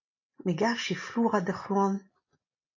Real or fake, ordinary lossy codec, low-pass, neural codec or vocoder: real; AAC, 32 kbps; 7.2 kHz; none